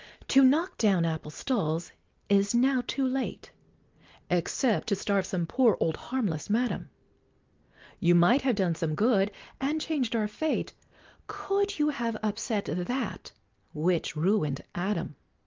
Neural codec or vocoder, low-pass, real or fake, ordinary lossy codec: none; 7.2 kHz; real; Opus, 32 kbps